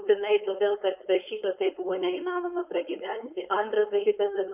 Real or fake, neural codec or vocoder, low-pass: fake; codec, 16 kHz, 4.8 kbps, FACodec; 3.6 kHz